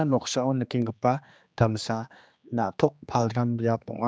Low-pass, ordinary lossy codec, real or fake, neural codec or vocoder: none; none; fake; codec, 16 kHz, 2 kbps, X-Codec, HuBERT features, trained on general audio